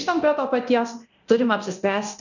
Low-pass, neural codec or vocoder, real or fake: 7.2 kHz; codec, 24 kHz, 0.9 kbps, DualCodec; fake